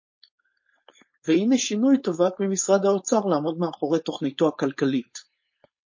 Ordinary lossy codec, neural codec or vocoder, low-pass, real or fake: MP3, 32 kbps; codec, 16 kHz, 4.8 kbps, FACodec; 7.2 kHz; fake